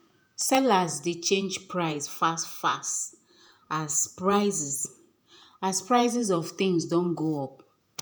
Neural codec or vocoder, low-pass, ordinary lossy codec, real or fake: vocoder, 48 kHz, 128 mel bands, Vocos; none; none; fake